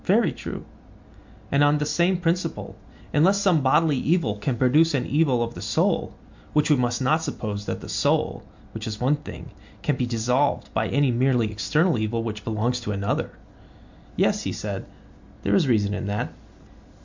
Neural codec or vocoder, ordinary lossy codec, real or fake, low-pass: none; MP3, 64 kbps; real; 7.2 kHz